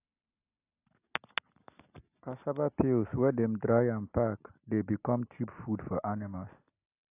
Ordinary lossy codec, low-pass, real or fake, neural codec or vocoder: AAC, 32 kbps; 3.6 kHz; real; none